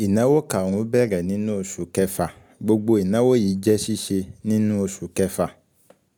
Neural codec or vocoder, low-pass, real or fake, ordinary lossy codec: none; none; real; none